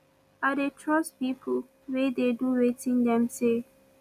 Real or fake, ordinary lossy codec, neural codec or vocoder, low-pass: real; none; none; 14.4 kHz